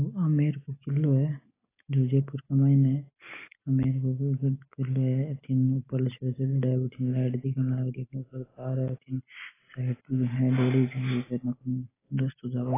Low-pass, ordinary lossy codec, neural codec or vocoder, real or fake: 3.6 kHz; AAC, 16 kbps; none; real